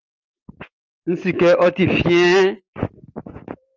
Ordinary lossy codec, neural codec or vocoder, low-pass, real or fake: Opus, 24 kbps; none; 7.2 kHz; real